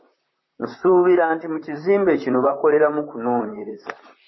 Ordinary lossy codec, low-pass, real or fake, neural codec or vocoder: MP3, 24 kbps; 7.2 kHz; fake; vocoder, 44.1 kHz, 128 mel bands, Pupu-Vocoder